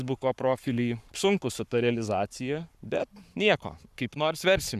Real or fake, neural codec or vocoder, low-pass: fake; codec, 44.1 kHz, 7.8 kbps, Pupu-Codec; 14.4 kHz